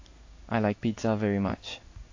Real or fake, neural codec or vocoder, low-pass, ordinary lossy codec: fake; codec, 16 kHz in and 24 kHz out, 1 kbps, XY-Tokenizer; 7.2 kHz; AAC, 48 kbps